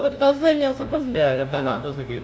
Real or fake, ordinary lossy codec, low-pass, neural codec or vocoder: fake; none; none; codec, 16 kHz, 0.5 kbps, FunCodec, trained on LibriTTS, 25 frames a second